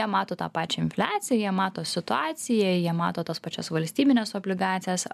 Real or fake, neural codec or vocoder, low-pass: real; none; 14.4 kHz